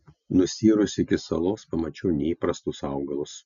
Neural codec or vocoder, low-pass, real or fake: none; 7.2 kHz; real